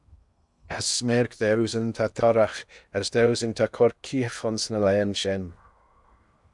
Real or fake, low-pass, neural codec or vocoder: fake; 10.8 kHz; codec, 16 kHz in and 24 kHz out, 0.8 kbps, FocalCodec, streaming, 65536 codes